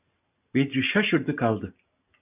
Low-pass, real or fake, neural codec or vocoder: 3.6 kHz; real; none